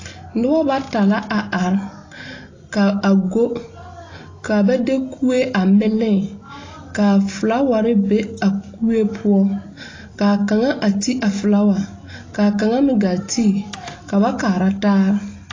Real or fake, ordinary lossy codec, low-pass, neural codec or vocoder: real; AAC, 32 kbps; 7.2 kHz; none